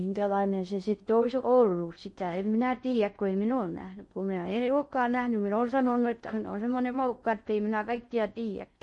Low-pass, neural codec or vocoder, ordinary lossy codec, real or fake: 10.8 kHz; codec, 16 kHz in and 24 kHz out, 0.6 kbps, FocalCodec, streaming, 4096 codes; MP3, 48 kbps; fake